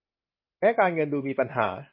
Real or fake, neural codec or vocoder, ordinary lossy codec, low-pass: real; none; MP3, 24 kbps; 5.4 kHz